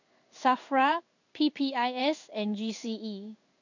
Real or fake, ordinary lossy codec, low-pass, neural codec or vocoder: fake; none; 7.2 kHz; codec, 16 kHz in and 24 kHz out, 1 kbps, XY-Tokenizer